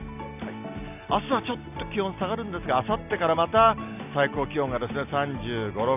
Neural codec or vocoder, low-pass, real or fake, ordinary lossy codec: none; 3.6 kHz; real; none